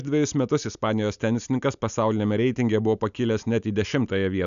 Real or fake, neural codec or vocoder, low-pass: real; none; 7.2 kHz